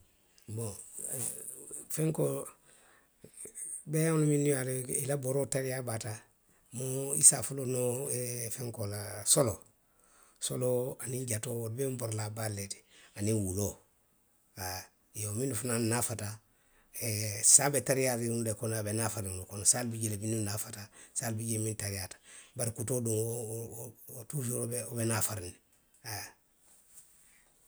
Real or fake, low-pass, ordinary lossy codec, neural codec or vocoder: real; none; none; none